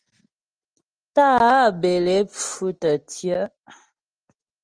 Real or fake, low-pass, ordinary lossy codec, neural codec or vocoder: real; 9.9 kHz; Opus, 24 kbps; none